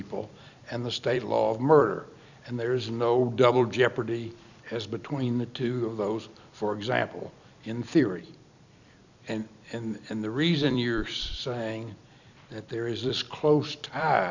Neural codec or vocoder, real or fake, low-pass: vocoder, 44.1 kHz, 128 mel bands every 256 samples, BigVGAN v2; fake; 7.2 kHz